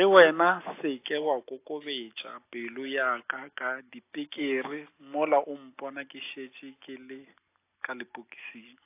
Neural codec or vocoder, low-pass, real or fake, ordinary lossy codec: none; 3.6 kHz; real; AAC, 24 kbps